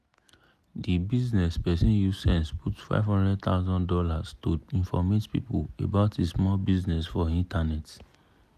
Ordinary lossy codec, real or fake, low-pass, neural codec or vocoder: none; real; 14.4 kHz; none